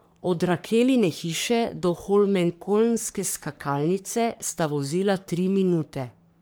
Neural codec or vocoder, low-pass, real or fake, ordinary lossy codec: codec, 44.1 kHz, 3.4 kbps, Pupu-Codec; none; fake; none